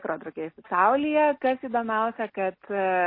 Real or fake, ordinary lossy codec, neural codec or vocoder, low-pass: real; MP3, 24 kbps; none; 5.4 kHz